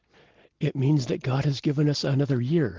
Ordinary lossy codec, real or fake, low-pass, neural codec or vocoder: Opus, 16 kbps; real; 7.2 kHz; none